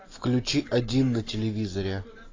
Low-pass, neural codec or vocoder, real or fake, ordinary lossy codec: 7.2 kHz; none; real; AAC, 32 kbps